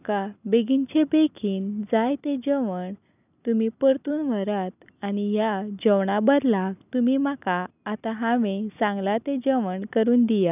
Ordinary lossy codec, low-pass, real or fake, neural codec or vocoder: none; 3.6 kHz; real; none